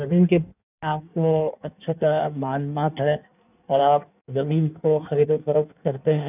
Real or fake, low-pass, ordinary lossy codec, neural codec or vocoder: fake; 3.6 kHz; none; codec, 16 kHz in and 24 kHz out, 1.1 kbps, FireRedTTS-2 codec